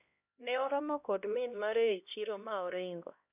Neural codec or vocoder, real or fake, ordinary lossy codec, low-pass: codec, 16 kHz, 1 kbps, X-Codec, HuBERT features, trained on LibriSpeech; fake; none; 3.6 kHz